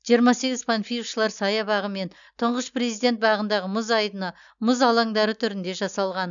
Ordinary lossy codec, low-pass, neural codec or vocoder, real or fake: none; 7.2 kHz; none; real